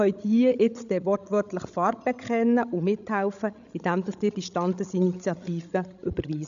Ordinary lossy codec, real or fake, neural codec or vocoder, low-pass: none; fake; codec, 16 kHz, 16 kbps, FreqCodec, larger model; 7.2 kHz